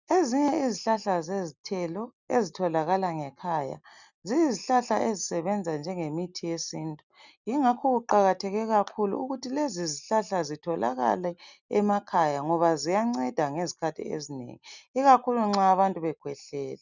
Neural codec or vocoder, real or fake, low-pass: none; real; 7.2 kHz